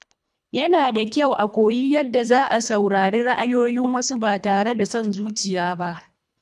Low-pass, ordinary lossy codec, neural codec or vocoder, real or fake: none; none; codec, 24 kHz, 1.5 kbps, HILCodec; fake